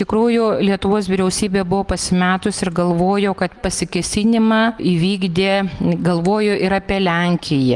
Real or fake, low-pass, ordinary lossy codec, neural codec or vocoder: real; 10.8 kHz; Opus, 24 kbps; none